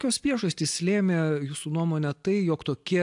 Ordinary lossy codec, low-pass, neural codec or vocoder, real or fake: AAC, 64 kbps; 10.8 kHz; none; real